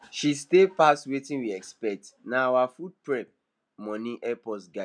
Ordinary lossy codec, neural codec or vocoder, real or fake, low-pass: none; none; real; 9.9 kHz